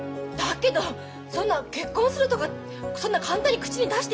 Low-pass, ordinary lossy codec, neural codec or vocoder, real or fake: none; none; none; real